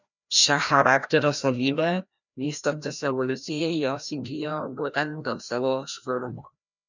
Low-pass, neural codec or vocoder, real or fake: 7.2 kHz; codec, 16 kHz, 1 kbps, FreqCodec, larger model; fake